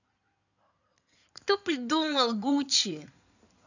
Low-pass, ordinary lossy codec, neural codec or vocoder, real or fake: 7.2 kHz; none; codec, 16 kHz in and 24 kHz out, 2.2 kbps, FireRedTTS-2 codec; fake